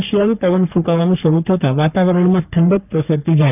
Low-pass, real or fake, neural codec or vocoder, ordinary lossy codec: 3.6 kHz; fake; codec, 44.1 kHz, 3.4 kbps, Pupu-Codec; none